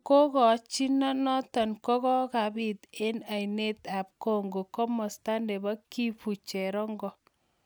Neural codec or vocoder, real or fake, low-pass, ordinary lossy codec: none; real; none; none